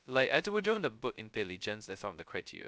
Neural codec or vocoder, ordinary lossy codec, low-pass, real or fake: codec, 16 kHz, 0.2 kbps, FocalCodec; none; none; fake